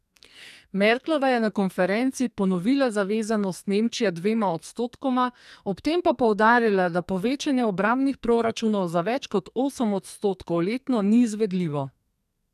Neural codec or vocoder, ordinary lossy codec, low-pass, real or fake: codec, 44.1 kHz, 2.6 kbps, SNAC; none; 14.4 kHz; fake